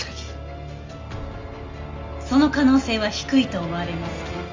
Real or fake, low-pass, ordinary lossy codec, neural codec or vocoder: real; 7.2 kHz; Opus, 32 kbps; none